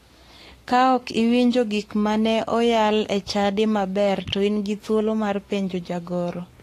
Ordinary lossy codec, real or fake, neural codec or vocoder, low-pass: AAC, 48 kbps; fake; codec, 44.1 kHz, 7.8 kbps, Pupu-Codec; 14.4 kHz